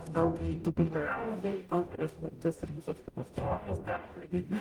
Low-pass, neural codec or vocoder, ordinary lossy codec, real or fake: 19.8 kHz; codec, 44.1 kHz, 0.9 kbps, DAC; Opus, 32 kbps; fake